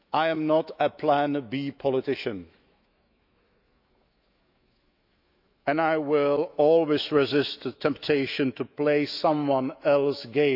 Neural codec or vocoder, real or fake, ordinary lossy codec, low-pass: autoencoder, 48 kHz, 128 numbers a frame, DAC-VAE, trained on Japanese speech; fake; none; 5.4 kHz